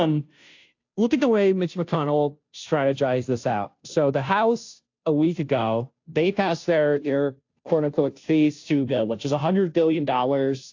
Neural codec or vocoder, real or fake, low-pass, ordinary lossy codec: codec, 16 kHz, 0.5 kbps, FunCodec, trained on Chinese and English, 25 frames a second; fake; 7.2 kHz; AAC, 48 kbps